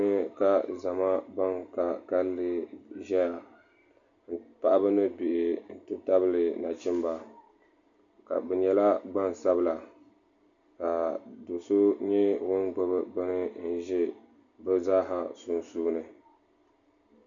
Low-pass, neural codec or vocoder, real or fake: 7.2 kHz; none; real